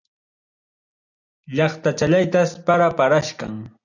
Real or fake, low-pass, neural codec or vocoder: real; 7.2 kHz; none